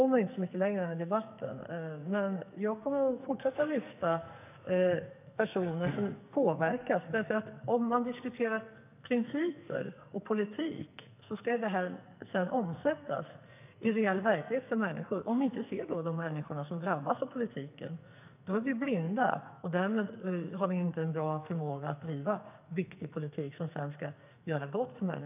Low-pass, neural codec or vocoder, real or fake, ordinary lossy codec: 3.6 kHz; codec, 44.1 kHz, 2.6 kbps, SNAC; fake; none